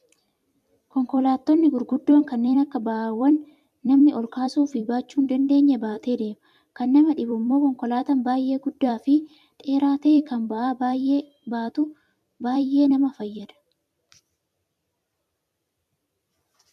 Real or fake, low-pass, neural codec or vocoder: fake; 14.4 kHz; vocoder, 44.1 kHz, 128 mel bands every 256 samples, BigVGAN v2